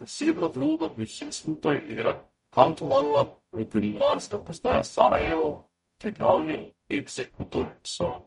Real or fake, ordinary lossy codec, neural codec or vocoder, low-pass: fake; MP3, 48 kbps; codec, 44.1 kHz, 0.9 kbps, DAC; 19.8 kHz